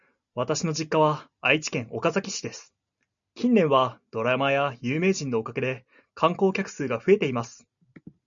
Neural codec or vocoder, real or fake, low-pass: none; real; 7.2 kHz